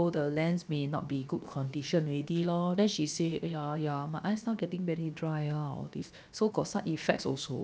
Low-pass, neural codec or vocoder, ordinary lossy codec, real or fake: none; codec, 16 kHz, 0.7 kbps, FocalCodec; none; fake